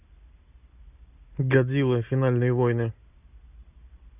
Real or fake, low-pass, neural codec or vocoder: real; 3.6 kHz; none